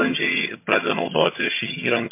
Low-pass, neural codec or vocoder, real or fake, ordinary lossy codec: 3.6 kHz; vocoder, 22.05 kHz, 80 mel bands, HiFi-GAN; fake; MP3, 24 kbps